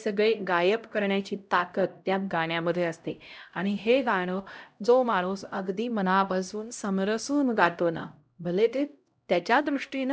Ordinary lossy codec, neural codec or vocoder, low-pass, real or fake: none; codec, 16 kHz, 0.5 kbps, X-Codec, HuBERT features, trained on LibriSpeech; none; fake